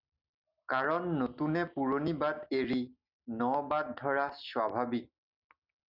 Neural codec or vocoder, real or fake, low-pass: none; real; 5.4 kHz